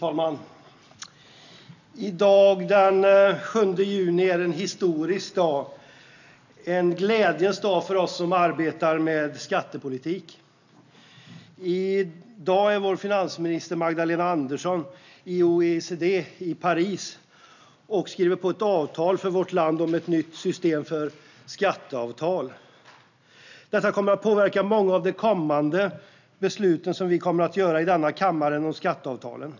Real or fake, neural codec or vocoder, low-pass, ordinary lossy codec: real; none; 7.2 kHz; none